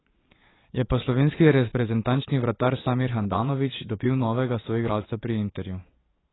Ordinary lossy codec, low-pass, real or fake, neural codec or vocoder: AAC, 16 kbps; 7.2 kHz; fake; vocoder, 22.05 kHz, 80 mel bands, Vocos